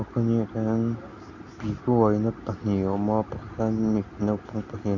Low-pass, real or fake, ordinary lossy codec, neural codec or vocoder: 7.2 kHz; real; none; none